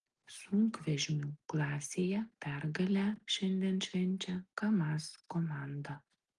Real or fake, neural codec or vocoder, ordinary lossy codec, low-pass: real; none; Opus, 24 kbps; 10.8 kHz